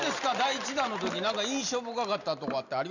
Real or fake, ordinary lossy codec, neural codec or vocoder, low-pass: real; none; none; 7.2 kHz